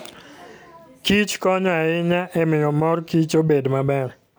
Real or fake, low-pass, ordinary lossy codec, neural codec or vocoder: real; none; none; none